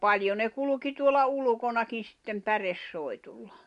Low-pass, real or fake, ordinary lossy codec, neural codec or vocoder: 10.8 kHz; real; MP3, 64 kbps; none